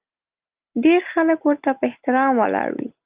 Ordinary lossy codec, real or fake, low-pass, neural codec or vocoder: Opus, 24 kbps; real; 3.6 kHz; none